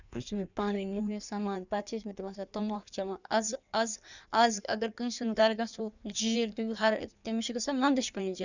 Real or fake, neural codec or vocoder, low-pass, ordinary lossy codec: fake; codec, 16 kHz in and 24 kHz out, 1.1 kbps, FireRedTTS-2 codec; 7.2 kHz; none